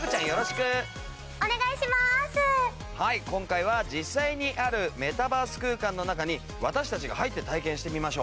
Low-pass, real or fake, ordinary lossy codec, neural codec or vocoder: none; real; none; none